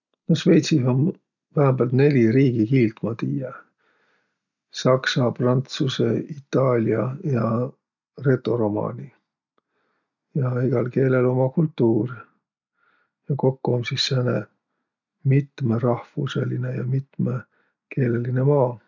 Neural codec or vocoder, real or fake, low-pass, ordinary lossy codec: none; real; 7.2 kHz; none